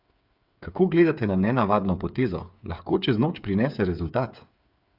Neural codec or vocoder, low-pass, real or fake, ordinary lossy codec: codec, 16 kHz, 8 kbps, FreqCodec, smaller model; 5.4 kHz; fake; Opus, 64 kbps